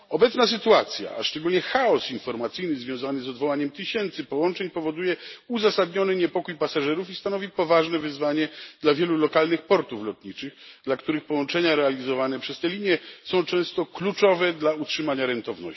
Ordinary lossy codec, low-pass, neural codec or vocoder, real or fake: MP3, 24 kbps; 7.2 kHz; none; real